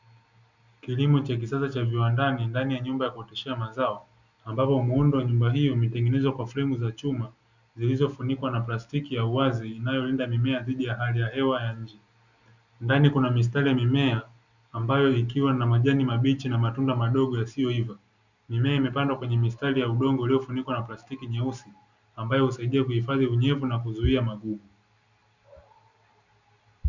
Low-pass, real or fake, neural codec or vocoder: 7.2 kHz; real; none